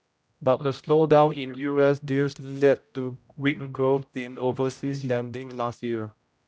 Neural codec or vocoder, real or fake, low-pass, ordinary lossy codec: codec, 16 kHz, 0.5 kbps, X-Codec, HuBERT features, trained on general audio; fake; none; none